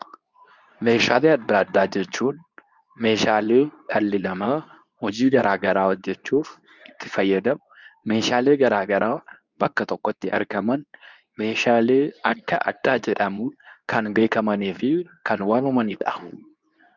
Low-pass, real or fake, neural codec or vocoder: 7.2 kHz; fake; codec, 24 kHz, 0.9 kbps, WavTokenizer, medium speech release version 2